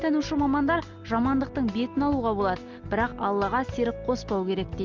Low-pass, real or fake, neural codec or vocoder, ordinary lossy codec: 7.2 kHz; real; none; Opus, 32 kbps